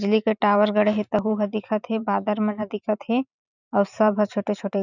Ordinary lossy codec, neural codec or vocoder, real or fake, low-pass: none; vocoder, 44.1 kHz, 128 mel bands every 512 samples, BigVGAN v2; fake; 7.2 kHz